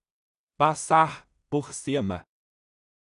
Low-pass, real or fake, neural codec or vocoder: 10.8 kHz; fake; codec, 16 kHz in and 24 kHz out, 0.4 kbps, LongCat-Audio-Codec, two codebook decoder